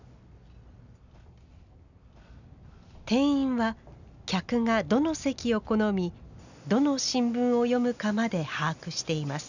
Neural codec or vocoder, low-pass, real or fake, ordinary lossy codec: none; 7.2 kHz; real; none